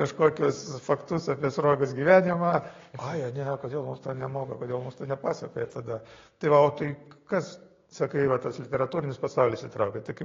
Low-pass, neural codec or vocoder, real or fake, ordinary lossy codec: 7.2 kHz; codec, 16 kHz, 6 kbps, DAC; fake; AAC, 32 kbps